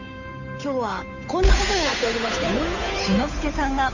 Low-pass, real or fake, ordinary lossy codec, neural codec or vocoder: 7.2 kHz; fake; none; codec, 16 kHz, 8 kbps, FunCodec, trained on Chinese and English, 25 frames a second